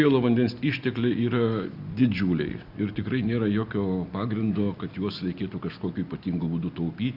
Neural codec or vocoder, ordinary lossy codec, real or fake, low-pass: none; Opus, 64 kbps; real; 5.4 kHz